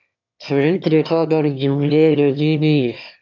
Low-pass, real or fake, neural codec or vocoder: 7.2 kHz; fake; autoencoder, 22.05 kHz, a latent of 192 numbers a frame, VITS, trained on one speaker